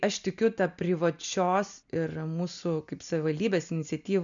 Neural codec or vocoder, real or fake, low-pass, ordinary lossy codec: none; real; 7.2 kHz; MP3, 96 kbps